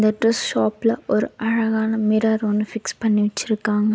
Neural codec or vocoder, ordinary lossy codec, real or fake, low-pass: none; none; real; none